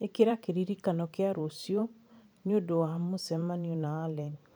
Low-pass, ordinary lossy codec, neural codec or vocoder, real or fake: none; none; vocoder, 44.1 kHz, 128 mel bands every 512 samples, BigVGAN v2; fake